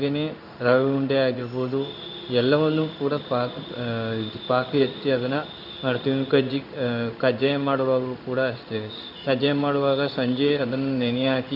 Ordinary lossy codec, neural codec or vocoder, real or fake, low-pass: none; codec, 16 kHz in and 24 kHz out, 1 kbps, XY-Tokenizer; fake; 5.4 kHz